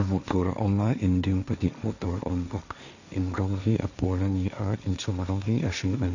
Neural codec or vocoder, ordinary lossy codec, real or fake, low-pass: codec, 16 kHz, 1.1 kbps, Voila-Tokenizer; none; fake; 7.2 kHz